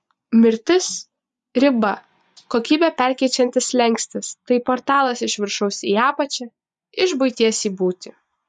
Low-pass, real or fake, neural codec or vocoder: 10.8 kHz; fake; vocoder, 24 kHz, 100 mel bands, Vocos